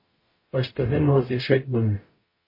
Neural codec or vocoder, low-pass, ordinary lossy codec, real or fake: codec, 44.1 kHz, 0.9 kbps, DAC; 5.4 kHz; MP3, 24 kbps; fake